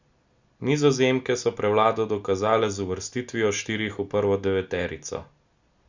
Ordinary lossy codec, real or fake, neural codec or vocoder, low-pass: Opus, 64 kbps; real; none; 7.2 kHz